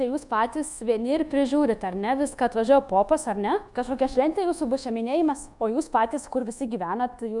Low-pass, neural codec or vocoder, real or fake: 10.8 kHz; codec, 24 kHz, 1.2 kbps, DualCodec; fake